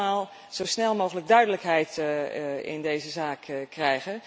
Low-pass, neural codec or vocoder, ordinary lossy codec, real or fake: none; none; none; real